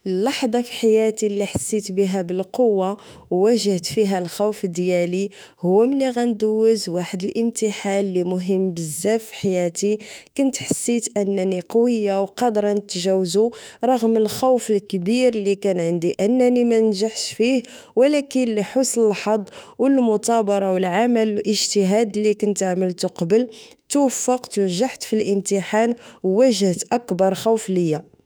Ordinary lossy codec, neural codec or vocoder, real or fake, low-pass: none; autoencoder, 48 kHz, 32 numbers a frame, DAC-VAE, trained on Japanese speech; fake; none